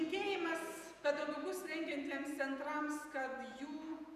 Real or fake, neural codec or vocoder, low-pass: fake; vocoder, 48 kHz, 128 mel bands, Vocos; 14.4 kHz